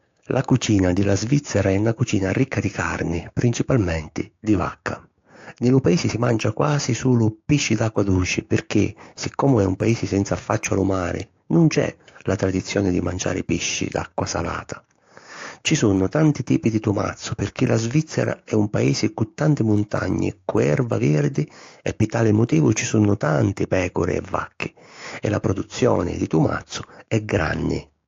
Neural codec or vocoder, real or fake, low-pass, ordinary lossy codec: codec, 16 kHz, 6 kbps, DAC; fake; 7.2 kHz; AAC, 48 kbps